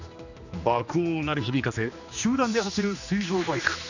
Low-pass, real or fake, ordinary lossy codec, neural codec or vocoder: 7.2 kHz; fake; none; codec, 16 kHz, 2 kbps, X-Codec, HuBERT features, trained on general audio